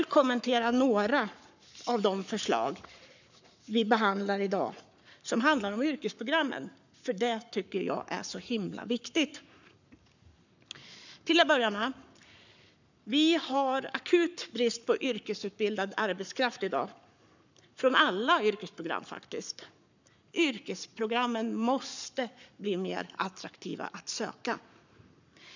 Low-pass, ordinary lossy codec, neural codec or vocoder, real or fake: 7.2 kHz; none; codec, 44.1 kHz, 7.8 kbps, Pupu-Codec; fake